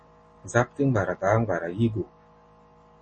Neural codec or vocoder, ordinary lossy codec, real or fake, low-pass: none; MP3, 32 kbps; real; 9.9 kHz